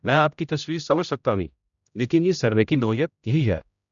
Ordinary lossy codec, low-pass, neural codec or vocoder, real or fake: none; 7.2 kHz; codec, 16 kHz, 0.5 kbps, X-Codec, HuBERT features, trained on general audio; fake